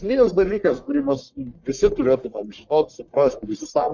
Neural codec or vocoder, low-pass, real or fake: codec, 44.1 kHz, 1.7 kbps, Pupu-Codec; 7.2 kHz; fake